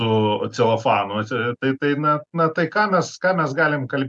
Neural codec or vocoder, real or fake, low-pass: none; real; 10.8 kHz